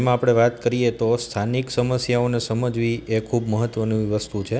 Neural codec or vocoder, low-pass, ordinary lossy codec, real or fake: none; none; none; real